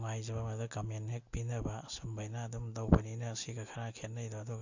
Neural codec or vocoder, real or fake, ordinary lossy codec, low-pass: none; real; AAC, 48 kbps; 7.2 kHz